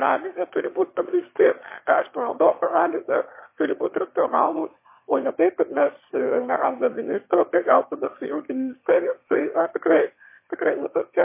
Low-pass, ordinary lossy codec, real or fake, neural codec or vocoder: 3.6 kHz; MP3, 24 kbps; fake; autoencoder, 22.05 kHz, a latent of 192 numbers a frame, VITS, trained on one speaker